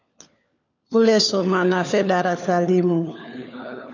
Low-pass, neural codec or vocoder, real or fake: 7.2 kHz; codec, 16 kHz, 4 kbps, FunCodec, trained on LibriTTS, 50 frames a second; fake